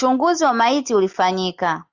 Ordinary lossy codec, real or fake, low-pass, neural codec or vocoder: Opus, 64 kbps; real; 7.2 kHz; none